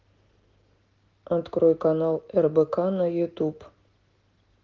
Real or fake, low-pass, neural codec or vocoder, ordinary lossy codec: real; 7.2 kHz; none; Opus, 16 kbps